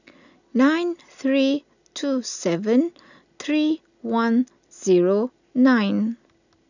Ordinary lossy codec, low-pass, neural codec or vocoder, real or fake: none; 7.2 kHz; none; real